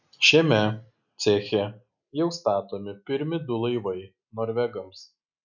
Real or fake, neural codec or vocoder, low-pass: real; none; 7.2 kHz